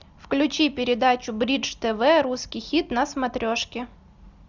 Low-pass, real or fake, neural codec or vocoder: 7.2 kHz; real; none